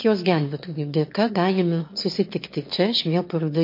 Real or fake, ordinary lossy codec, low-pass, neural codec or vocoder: fake; MP3, 32 kbps; 5.4 kHz; autoencoder, 22.05 kHz, a latent of 192 numbers a frame, VITS, trained on one speaker